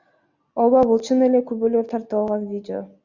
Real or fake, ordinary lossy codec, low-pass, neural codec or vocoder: real; Opus, 64 kbps; 7.2 kHz; none